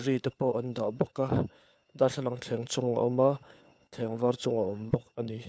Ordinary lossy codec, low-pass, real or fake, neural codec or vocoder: none; none; fake; codec, 16 kHz, 4 kbps, FunCodec, trained on LibriTTS, 50 frames a second